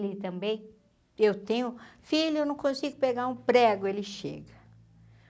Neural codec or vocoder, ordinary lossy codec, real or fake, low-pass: none; none; real; none